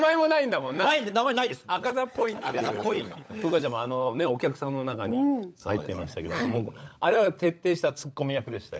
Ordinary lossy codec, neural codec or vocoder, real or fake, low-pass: none; codec, 16 kHz, 16 kbps, FunCodec, trained on LibriTTS, 50 frames a second; fake; none